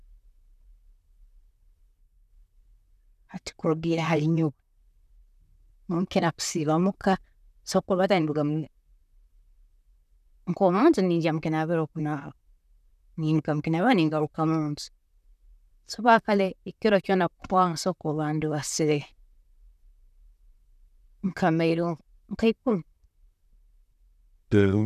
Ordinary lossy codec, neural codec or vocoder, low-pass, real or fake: none; vocoder, 44.1 kHz, 128 mel bands, Pupu-Vocoder; 14.4 kHz; fake